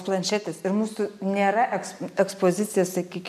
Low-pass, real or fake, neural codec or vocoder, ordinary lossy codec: 14.4 kHz; real; none; MP3, 96 kbps